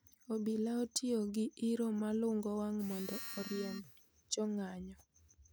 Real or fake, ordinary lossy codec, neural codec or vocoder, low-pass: real; none; none; none